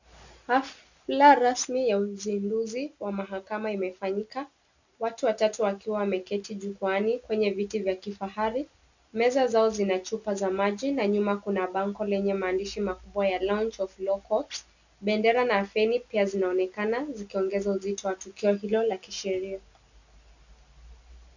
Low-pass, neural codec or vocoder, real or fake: 7.2 kHz; none; real